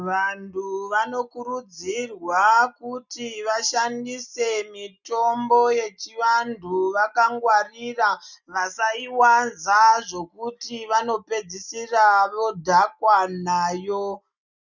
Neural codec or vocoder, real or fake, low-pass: none; real; 7.2 kHz